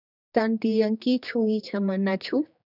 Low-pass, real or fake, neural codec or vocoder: 5.4 kHz; fake; codec, 16 kHz, 2 kbps, X-Codec, HuBERT features, trained on general audio